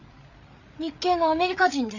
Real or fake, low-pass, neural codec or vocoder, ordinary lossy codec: fake; 7.2 kHz; codec, 16 kHz, 8 kbps, FreqCodec, larger model; none